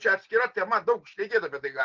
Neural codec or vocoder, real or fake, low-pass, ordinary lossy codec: none; real; 7.2 kHz; Opus, 16 kbps